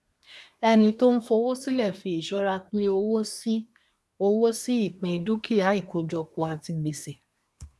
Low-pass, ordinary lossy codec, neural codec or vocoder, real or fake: none; none; codec, 24 kHz, 1 kbps, SNAC; fake